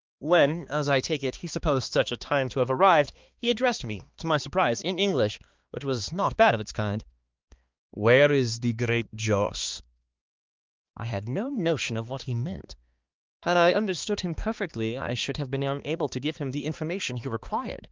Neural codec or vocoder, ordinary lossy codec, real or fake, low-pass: codec, 16 kHz, 2 kbps, X-Codec, HuBERT features, trained on balanced general audio; Opus, 32 kbps; fake; 7.2 kHz